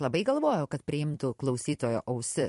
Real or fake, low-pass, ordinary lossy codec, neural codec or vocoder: fake; 14.4 kHz; MP3, 48 kbps; vocoder, 44.1 kHz, 128 mel bands every 256 samples, BigVGAN v2